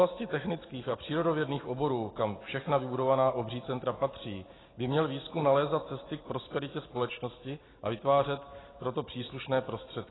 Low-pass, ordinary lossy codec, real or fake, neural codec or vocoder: 7.2 kHz; AAC, 16 kbps; real; none